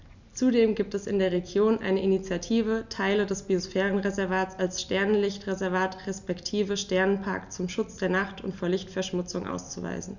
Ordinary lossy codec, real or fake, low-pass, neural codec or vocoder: none; real; 7.2 kHz; none